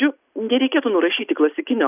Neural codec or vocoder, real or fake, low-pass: none; real; 3.6 kHz